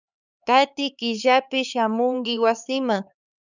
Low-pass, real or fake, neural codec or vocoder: 7.2 kHz; fake; codec, 16 kHz, 4 kbps, X-Codec, HuBERT features, trained on LibriSpeech